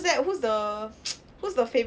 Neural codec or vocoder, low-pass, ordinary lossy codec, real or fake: none; none; none; real